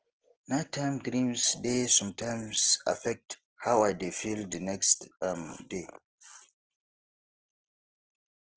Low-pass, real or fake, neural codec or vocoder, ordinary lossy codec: 7.2 kHz; real; none; Opus, 16 kbps